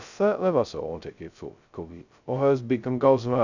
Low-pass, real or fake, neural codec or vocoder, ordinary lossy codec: 7.2 kHz; fake; codec, 16 kHz, 0.2 kbps, FocalCodec; none